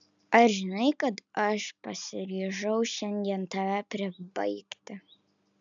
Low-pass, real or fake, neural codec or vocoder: 7.2 kHz; real; none